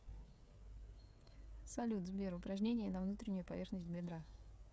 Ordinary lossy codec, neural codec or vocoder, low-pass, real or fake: none; codec, 16 kHz, 16 kbps, FreqCodec, smaller model; none; fake